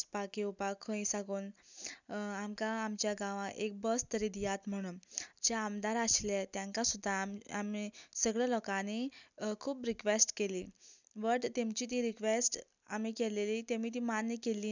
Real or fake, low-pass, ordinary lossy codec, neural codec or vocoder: real; 7.2 kHz; none; none